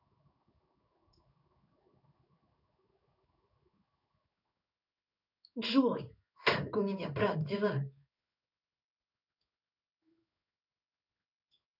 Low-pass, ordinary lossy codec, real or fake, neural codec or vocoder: 5.4 kHz; none; fake; codec, 16 kHz in and 24 kHz out, 1 kbps, XY-Tokenizer